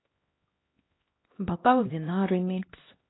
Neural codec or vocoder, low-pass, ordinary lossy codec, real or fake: codec, 16 kHz, 1 kbps, X-Codec, HuBERT features, trained on LibriSpeech; 7.2 kHz; AAC, 16 kbps; fake